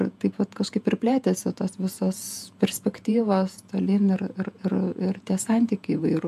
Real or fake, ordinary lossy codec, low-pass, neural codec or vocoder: fake; AAC, 96 kbps; 14.4 kHz; vocoder, 48 kHz, 128 mel bands, Vocos